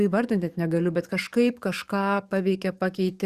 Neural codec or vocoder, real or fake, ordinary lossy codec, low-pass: codec, 44.1 kHz, 7.8 kbps, DAC; fake; Opus, 64 kbps; 14.4 kHz